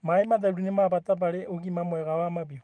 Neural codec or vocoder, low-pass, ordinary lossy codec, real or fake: vocoder, 44.1 kHz, 128 mel bands every 512 samples, BigVGAN v2; 9.9 kHz; Opus, 24 kbps; fake